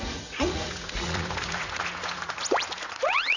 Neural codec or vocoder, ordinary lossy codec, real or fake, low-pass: vocoder, 44.1 kHz, 80 mel bands, Vocos; none; fake; 7.2 kHz